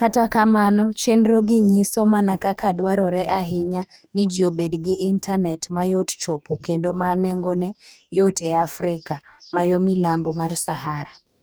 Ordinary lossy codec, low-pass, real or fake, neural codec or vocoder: none; none; fake; codec, 44.1 kHz, 2.6 kbps, DAC